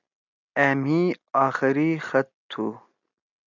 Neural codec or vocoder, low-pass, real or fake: none; 7.2 kHz; real